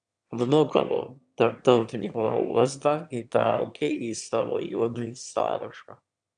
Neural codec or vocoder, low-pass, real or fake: autoencoder, 22.05 kHz, a latent of 192 numbers a frame, VITS, trained on one speaker; 9.9 kHz; fake